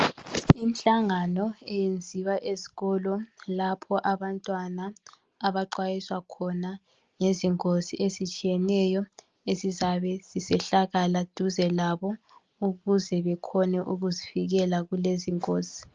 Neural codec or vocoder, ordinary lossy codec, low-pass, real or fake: none; Opus, 24 kbps; 7.2 kHz; real